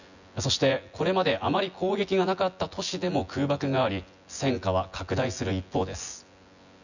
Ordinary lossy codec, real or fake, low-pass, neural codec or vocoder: none; fake; 7.2 kHz; vocoder, 24 kHz, 100 mel bands, Vocos